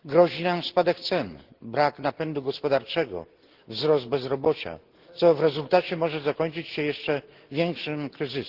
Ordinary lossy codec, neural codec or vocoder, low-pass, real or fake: Opus, 16 kbps; none; 5.4 kHz; real